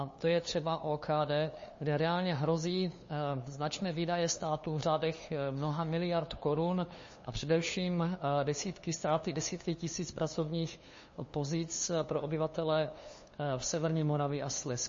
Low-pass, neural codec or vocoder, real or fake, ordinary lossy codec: 7.2 kHz; codec, 16 kHz, 2 kbps, FunCodec, trained on LibriTTS, 25 frames a second; fake; MP3, 32 kbps